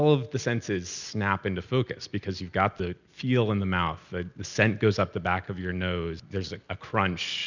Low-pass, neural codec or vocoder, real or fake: 7.2 kHz; none; real